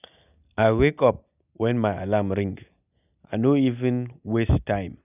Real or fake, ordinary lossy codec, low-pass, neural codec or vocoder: real; none; 3.6 kHz; none